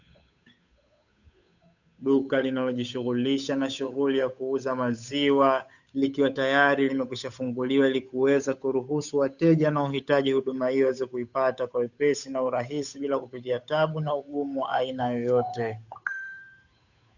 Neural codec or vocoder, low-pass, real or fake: codec, 16 kHz, 8 kbps, FunCodec, trained on Chinese and English, 25 frames a second; 7.2 kHz; fake